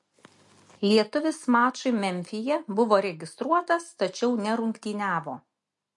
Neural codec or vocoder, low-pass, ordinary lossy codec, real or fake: none; 10.8 kHz; MP3, 48 kbps; real